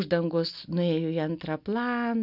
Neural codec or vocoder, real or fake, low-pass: none; real; 5.4 kHz